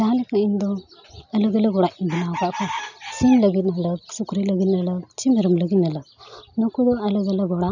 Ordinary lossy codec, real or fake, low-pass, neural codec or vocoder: none; real; 7.2 kHz; none